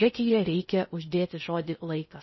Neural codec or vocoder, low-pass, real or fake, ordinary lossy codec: codec, 16 kHz in and 24 kHz out, 0.6 kbps, FocalCodec, streaming, 4096 codes; 7.2 kHz; fake; MP3, 24 kbps